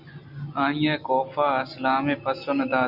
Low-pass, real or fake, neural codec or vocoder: 5.4 kHz; real; none